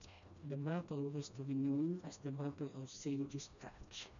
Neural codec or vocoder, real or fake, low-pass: codec, 16 kHz, 1 kbps, FreqCodec, smaller model; fake; 7.2 kHz